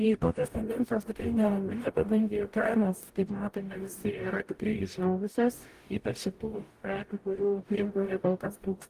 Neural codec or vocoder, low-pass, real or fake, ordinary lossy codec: codec, 44.1 kHz, 0.9 kbps, DAC; 14.4 kHz; fake; Opus, 24 kbps